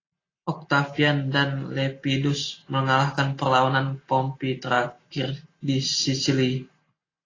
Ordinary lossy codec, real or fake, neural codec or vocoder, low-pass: AAC, 32 kbps; real; none; 7.2 kHz